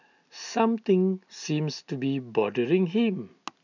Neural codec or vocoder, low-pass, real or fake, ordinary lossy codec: none; 7.2 kHz; real; none